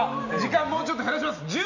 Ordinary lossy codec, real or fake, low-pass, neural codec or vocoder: none; real; 7.2 kHz; none